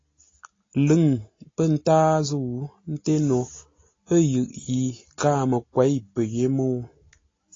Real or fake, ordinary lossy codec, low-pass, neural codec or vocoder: real; AAC, 32 kbps; 7.2 kHz; none